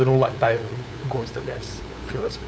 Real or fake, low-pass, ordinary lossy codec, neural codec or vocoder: fake; none; none; codec, 16 kHz, 8 kbps, FunCodec, trained on LibriTTS, 25 frames a second